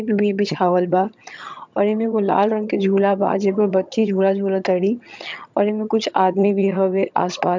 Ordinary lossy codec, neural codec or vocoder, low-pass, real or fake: MP3, 64 kbps; vocoder, 22.05 kHz, 80 mel bands, HiFi-GAN; 7.2 kHz; fake